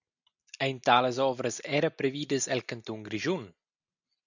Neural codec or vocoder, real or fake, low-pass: none; real; 7.2 kHz